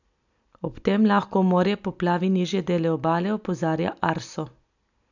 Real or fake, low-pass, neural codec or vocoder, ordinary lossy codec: real; 7.2 kHz; none; none